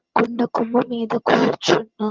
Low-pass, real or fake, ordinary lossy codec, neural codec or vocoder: 7.2 kHz; fake; Opus, 24 kbps; vocoder, 22.05 kHz, 80 mel bands, HiFi-GAN